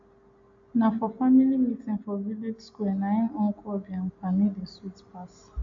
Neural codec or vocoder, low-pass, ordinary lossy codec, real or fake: none; 7.2 kHz; AAC, 48 kbps; real